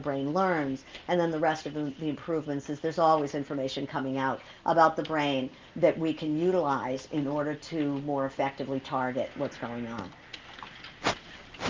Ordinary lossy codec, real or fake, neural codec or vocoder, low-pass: Opus, 24 kbps; real; none; 7.2 kHz